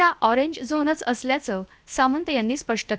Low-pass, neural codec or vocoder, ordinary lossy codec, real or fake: none; codec, 16 kHz, 0.7 kbps, FocalCodec; none; fake